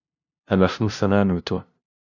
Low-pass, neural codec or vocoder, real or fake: 7.2 kHz; codec, 16 kHz, 0.5 kbps, FunCodec, trained on LibriTTS, 25 frames a second; fake